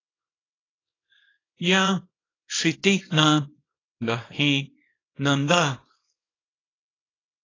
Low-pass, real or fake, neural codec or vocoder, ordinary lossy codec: 7.2 kHz; fake; codec, 24 kHz, 0.9 kbps, WavTokenizer, small release; AAC, 32 kbps